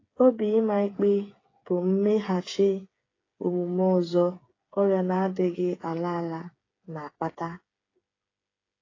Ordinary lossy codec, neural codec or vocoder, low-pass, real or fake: AAC, 32 kbps; codec, 16 kHz, 8 kbps, FreqCodec, smaller model; 7.2 kHz; fake